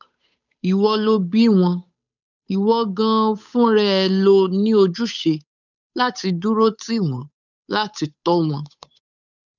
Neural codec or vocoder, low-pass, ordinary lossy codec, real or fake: codec, 16 kHz, 8 kbps, FunCodec, trained on Chinese and English, 25 frames a second; 7.2 kHz; none; fake